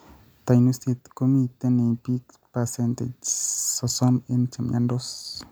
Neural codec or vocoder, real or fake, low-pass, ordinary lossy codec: none; real; none; none